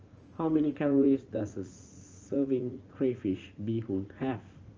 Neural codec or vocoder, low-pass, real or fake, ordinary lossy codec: vocoder, 44.1 kHz, 80 mel bands, Vocos; 7.2 kHz; fake; Opus, 24 kbps